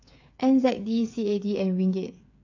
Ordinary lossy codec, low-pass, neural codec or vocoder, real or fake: AAC, 48 kbps; 7.2 kHz; codec, 16 kHz, 8 kbps, FreqCodec, smaller model; fake